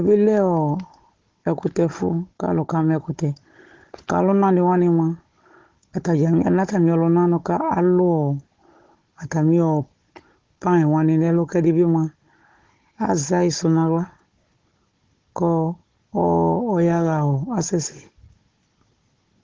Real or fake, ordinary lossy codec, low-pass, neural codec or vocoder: fake; Opus, 16 kbps; 7.2 kHz; codec, 16 kHz, 16 kbps, FunCodec, trained on Chinese and English, 50 frames a second